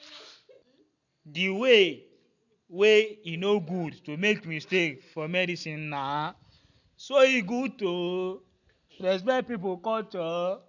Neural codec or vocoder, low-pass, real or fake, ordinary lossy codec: none; 7.2 kHz; real; none